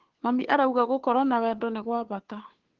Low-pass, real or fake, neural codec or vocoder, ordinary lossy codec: 7.2 kHz; fake; codec, 16 kHz, 2 kbps, FunCodec, trained on Chinese and English, 25 frames a second; Opus, 16 kbps